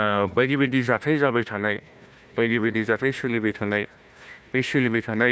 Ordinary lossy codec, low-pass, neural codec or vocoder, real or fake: none; none; codec, 16 kHz, 1 kbps, FunCodec, trained on Chinese and English, 50 frames a second; fake